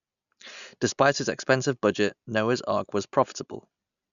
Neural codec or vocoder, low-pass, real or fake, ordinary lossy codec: none; 7.2 kHz; real; none